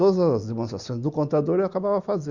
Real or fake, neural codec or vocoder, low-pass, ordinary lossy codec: real; none; 7.2 kHz; Opus, 64 kbps